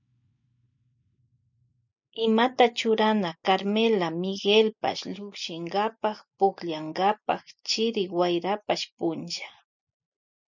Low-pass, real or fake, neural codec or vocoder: 7.2 kHz; real; none